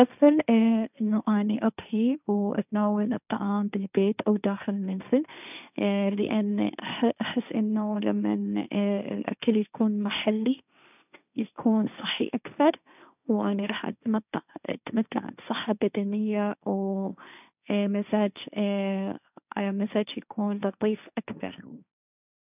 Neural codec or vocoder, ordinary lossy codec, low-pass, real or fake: codec, 16 kHz, 1.1 kbps, Voila-Tokenizer; none; 3.6 kHz; fake